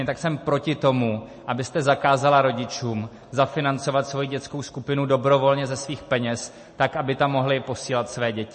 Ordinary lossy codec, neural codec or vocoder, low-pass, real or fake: MP3, 32 kbps; none; 10.8 kHz; real